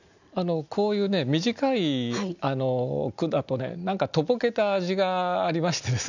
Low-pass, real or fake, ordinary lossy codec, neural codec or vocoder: 7.2 kHz; real; none; none